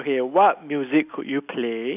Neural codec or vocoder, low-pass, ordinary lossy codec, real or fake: none; 3.6 kHz; none; real